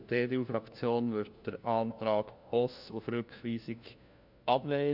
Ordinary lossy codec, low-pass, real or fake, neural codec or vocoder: none; 5.4 kHz; fake; codec, 16 kHz, 1 kbps, FunCodec, trained on LibriTTS, 50 frames a second